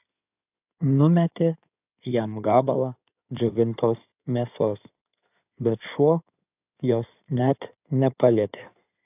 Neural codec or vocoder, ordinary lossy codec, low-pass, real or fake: codec, 16 kHz in and 24 kHz out, 2.2 kbps, FireRedTTS-2 codec; AAC, 32 kbps; 3.6 kHz; fake